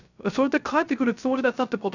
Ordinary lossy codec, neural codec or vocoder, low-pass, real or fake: none; codec, 16 kHz, 0.3 kbps, FocalCodec; 7.2 kHz; fake